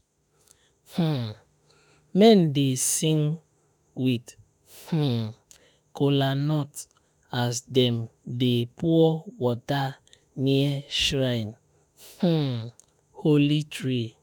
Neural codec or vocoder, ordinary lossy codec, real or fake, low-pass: autoencoder, 48 kHz, 32 numbers a frame, DAC-VAE, trained on Japanese speech; none; fake; none